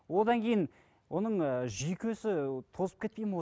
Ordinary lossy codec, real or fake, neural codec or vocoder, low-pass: none; real; none; none